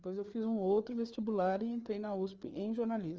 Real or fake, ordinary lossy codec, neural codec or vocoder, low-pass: fake; Opus, 24 kbps; codec, 16 kHz, 4 kbps, FreqCodec, larger model; 7.2 kHz